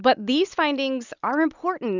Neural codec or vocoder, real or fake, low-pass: none; real; 7.2 kHz